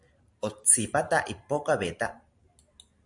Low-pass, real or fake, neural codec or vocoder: 10.8 kHz; fake; vocoder, 44.1 kHz, 128 mel bands every 512 samples, BigVGAN v2